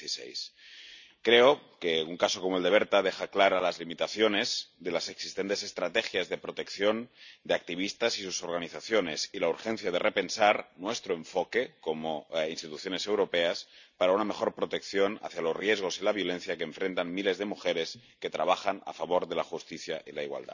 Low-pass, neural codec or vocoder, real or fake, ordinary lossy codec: 7.2 kHz; none; real; none